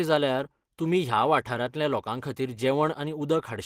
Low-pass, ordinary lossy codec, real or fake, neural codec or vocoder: 14.4 kHz; Opus, 16 kbps; real; none